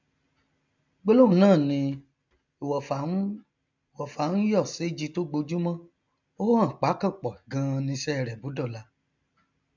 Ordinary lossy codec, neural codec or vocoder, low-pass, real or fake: MP3, 64 kbps; none; 7.2 kHz; real